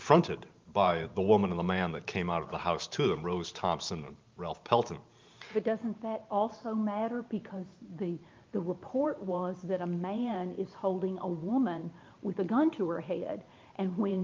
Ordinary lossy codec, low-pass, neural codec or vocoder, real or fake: Opus, 24 kbps; 7.2 kHz; vocoder, 44.1 kHz, 128 mel bands every 512 samples, BigVGAN v2; fake